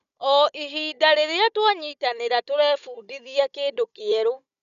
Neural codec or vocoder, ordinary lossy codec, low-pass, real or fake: codec, 16 kHz, 16 kbps, FunCodec, trained on Chinese and English, 50 frames a second; none; 7.2 kHz; fake